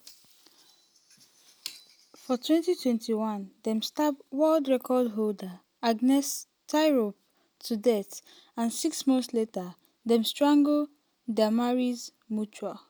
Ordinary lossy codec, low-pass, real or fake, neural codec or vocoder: none; none; real; none